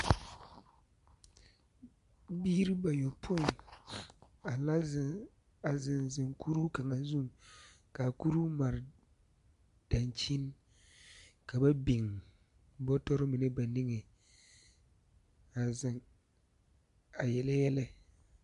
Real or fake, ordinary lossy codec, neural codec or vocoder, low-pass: real; MP3, 64 kbps; none; 10.8 kHz